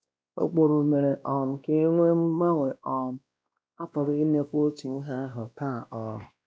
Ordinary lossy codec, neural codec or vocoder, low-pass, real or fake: none; codec, 16 kHz, 1 kbps, X-Codec, WavLM features, trained on Multilingual LibriSpeech; none; fake